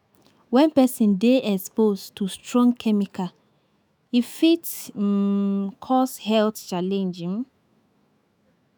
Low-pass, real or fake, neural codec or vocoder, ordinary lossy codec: none; fake; autoencoder, 48 kHz, 128 numbers a frame, DAC-VAE, trained on Japanese speech; none